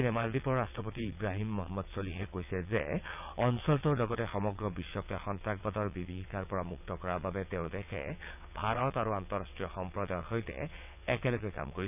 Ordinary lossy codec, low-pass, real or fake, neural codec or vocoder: none; 3.6 kHz; fake; vocoder, 22.05 kHz, 80 mel bands, WaveNeXt